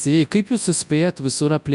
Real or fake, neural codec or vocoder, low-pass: fake; codec, 24 kHz, 0.9 kbps, WavTokenizer, large speech release; 10.8 kHz